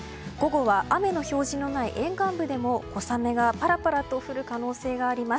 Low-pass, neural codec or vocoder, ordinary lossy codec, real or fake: none; none; none; real